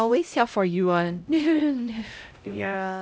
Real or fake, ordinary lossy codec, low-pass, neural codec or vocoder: fake; none; none; codec, 16 kHz, 0.5 kbps, X-Codec, HuBERT features, trained on LibriSpeech